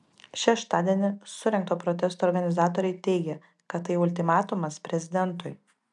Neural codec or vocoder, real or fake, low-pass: none; real; 10.8 kHz